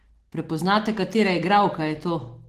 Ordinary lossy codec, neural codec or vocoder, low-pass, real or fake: Opus, 16 kbps; none; 14.4 kHz; real